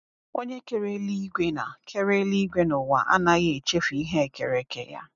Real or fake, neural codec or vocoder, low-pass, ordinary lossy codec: real; none; 7.2 kHz; none